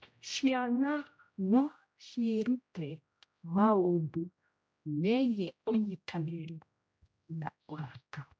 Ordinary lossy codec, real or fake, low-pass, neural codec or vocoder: none; fake; none; codec, 16 kHz, 0.5 kbps, X-Codec, HuBERT features, trained on general audio